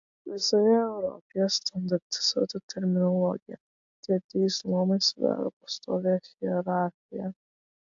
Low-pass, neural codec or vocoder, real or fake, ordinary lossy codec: 7.2 kHz; none; real; AAC, 64 kbps